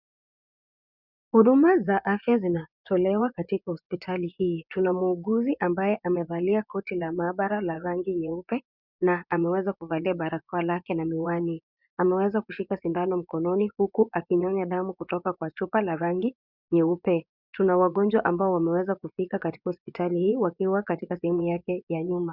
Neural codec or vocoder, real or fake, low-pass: vocoder, 24 kHz, 100 mel bands, Vocos; fake; 5.4 kHz